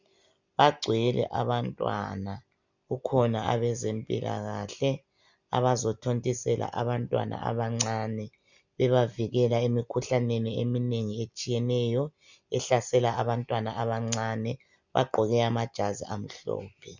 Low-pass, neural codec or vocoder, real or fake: 7.2 kHz; none; real